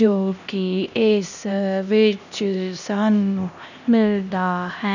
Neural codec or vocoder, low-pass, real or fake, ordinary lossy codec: codec, 16 kHz, 1 kbps, X-Codec, HuBERT features, trained on LibriSpeech; 7.2 kHz; fake; none